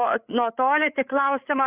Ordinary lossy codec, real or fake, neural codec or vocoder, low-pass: Opus, 64 kbps; fake; codec, 16 kHz, 8 kbps, FreqCodec, larger model; 3.6 kHz